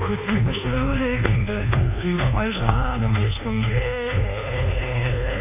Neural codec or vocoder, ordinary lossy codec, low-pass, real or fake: codec, 24 kHz, 1.2 kbps, DualCodec; none; 3.6 kHz; fake